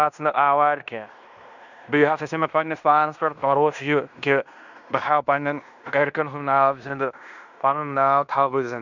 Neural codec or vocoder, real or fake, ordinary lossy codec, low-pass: codec, 16 kHz in and 24 kHz out, 0.9 kbps, LongCat-Audio-Codec, fine tuned four codebook decoder; fake; none; 7.2 kHz